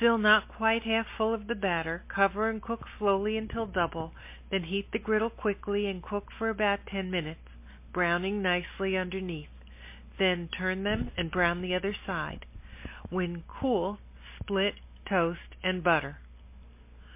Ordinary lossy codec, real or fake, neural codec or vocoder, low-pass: MP3, 24 kbps; real; none; 3.6 kHz